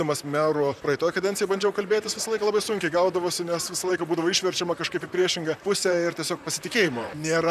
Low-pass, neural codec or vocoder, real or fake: 14.4 kHz; vocoder, 44.1 kHz, 128 mel bands, Pupu-Vocoder; fake